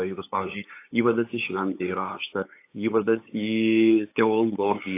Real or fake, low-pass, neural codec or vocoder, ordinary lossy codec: fake; 3.6 kHz; codec, 16 kHz, 8 kbps, FunCodec, trained on LibriTTS, 25 frames a second; AAC, 24 kbps